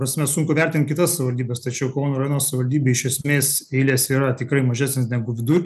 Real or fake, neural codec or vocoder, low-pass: real; none; 14.4 kHz